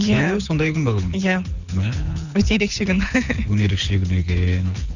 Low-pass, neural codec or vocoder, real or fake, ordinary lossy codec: 7.2 kHz; codec, 24 kHz, 6 kbps, HILCodec; fake; none